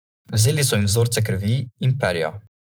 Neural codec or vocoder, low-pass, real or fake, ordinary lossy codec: vocoder, 44.1 kHz, 128 mel bands every 512 samples, BigVGAN v2; none; fake; none